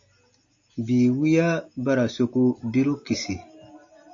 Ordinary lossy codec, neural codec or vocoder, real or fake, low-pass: AAC, 48 kbps; none; real; 7.2 kHz